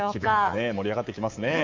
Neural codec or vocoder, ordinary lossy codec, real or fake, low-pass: codec, 24 kHz, 3.1 kbps, DualCodec; Opus, 32 kbps; fake; 7.2 kHz